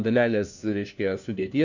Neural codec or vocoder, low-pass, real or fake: codec, 16 kHz, 1 kbps, FunCodec, trained on LibriTTS, 50 frames a second; 7.2 kHz; fake